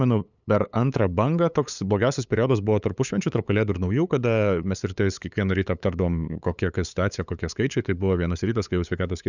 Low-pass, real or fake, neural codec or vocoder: 7.2 kHz; fake; codec, 16 kHz, 8 kbps, FunCodec, trained on LibriTTS, 25 frames a second